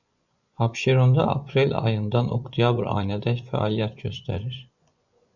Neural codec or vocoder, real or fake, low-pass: vocoder, 24 kHz, 100 mel bands, Vocos; fake; 7.2 kHz